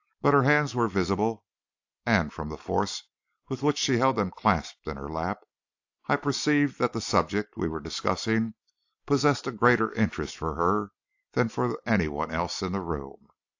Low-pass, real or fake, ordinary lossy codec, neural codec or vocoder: 7.2 kHz; real; AAC, 48 kbps; none